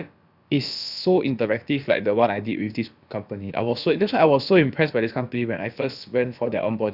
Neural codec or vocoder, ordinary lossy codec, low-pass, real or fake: codec, 16 kHz, about 1 kbps, DyCAST, with the encoder's durations; Opus, 64 kbps; 5.4 kHz; fake